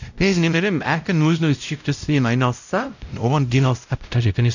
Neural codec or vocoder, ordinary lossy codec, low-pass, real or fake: codec, 16 kHz, 0.5 kbps, X-Codec, WavLM features, trained on Multilingual LibriSpeech; none; 7.2 kHz; fake